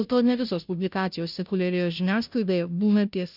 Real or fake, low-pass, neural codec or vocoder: fake; 5.4 kHz; codec, 16 kHz, 0.5 kbps, FunCodec, trained on Chinese and English, 25 frames a second